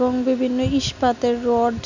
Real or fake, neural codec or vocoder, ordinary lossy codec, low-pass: real; none; none; 7.2 kHz